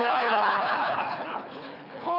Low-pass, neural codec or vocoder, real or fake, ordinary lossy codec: 5.4 kHz; codec, 24 kHz, 3 kbps, HILCodec; fake; none